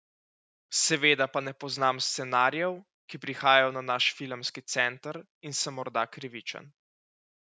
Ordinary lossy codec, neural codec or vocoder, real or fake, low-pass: none; none; real; none